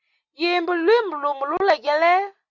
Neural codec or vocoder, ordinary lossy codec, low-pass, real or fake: none; Opus, 64 kbps; 7.2 kHz; real